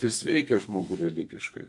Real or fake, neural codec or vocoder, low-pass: fake; codec, 32 kHz, 1.9 kbps, SNAC; 10.8 kHz